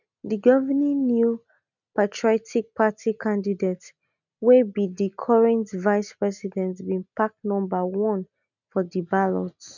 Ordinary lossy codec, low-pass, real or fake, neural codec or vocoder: none; 7.2 kHz; real; none